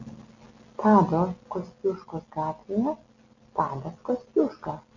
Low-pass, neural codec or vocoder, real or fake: 7.2 kHz; none; real